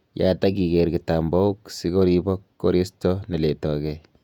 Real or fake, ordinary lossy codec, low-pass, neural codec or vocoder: fake; none; 19.8 kHz; vocoder, 48 kHz, 128 mel bands, Vocos